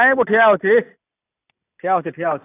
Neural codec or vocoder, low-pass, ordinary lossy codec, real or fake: none; 3.6 kHz; AAC, 24 kbps; real